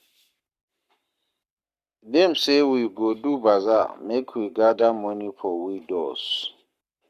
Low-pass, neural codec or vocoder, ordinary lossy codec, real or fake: 14.4 kHz; codec, 44.1 kHz, 7.8 kbps, Pupu-Codec; Opus, 64 kbps; fake